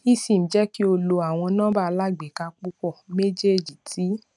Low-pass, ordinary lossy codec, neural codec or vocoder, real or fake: 10.8 kHz; none; none; real